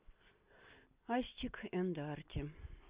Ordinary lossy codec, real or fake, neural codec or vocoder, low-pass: Opus, 64 kbps; real; none; 3.6 kHz